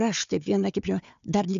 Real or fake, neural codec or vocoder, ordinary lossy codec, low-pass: fake; codec, 16 kHz, 4 kbps, FunCodec, trained on Chinese and English, 50 frames a second; MP3, 64 kbps; 7.2 kHz